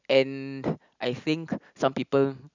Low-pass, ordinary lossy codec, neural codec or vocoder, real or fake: 7.2 kHz; none; none; real